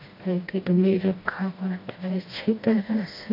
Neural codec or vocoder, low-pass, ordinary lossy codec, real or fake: codec, 16 kHz, 1 kbps, FreqCodec, smaller model; 5.4 kHz; MP3, 32 kbps; fake